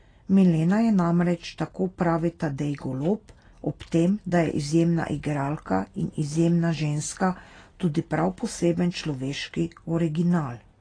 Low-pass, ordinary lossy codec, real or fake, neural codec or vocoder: 9.9 kHz; AAC, 32 kbps; real; none